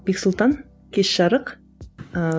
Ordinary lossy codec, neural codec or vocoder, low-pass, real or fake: none; none; none; real